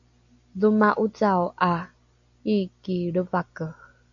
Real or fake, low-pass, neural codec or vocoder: real; 7.2 kHz; none